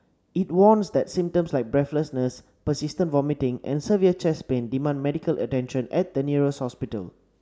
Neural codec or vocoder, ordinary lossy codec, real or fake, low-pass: none; none; real; none